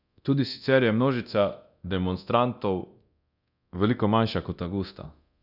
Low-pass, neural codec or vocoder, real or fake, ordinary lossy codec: 5.4 kHz; codec, 24 kHz, 0.9 kbps, DualCodec; fake; none